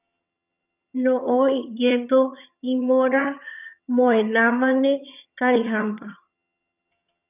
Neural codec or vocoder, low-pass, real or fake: vocoder, 22.05 kHz, 80 mel bands, HiFi-GAN; 3.6 kHz; fake